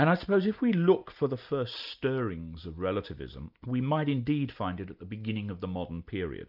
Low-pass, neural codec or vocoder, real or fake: 5.4 kHz; none; real